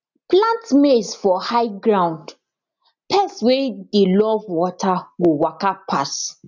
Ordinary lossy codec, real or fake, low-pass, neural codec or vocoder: none; real; 7.2 kHz; none